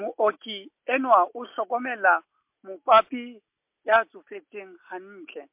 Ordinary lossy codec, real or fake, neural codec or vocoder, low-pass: MP3, 32 kbps; real; none; 3.6 kHz